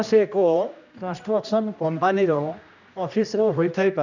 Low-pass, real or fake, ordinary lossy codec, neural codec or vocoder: 7.2 kHz; fake; none; codec, 16 kHz, 1 kbps, X-Codec, HuBERT features, trained on general audio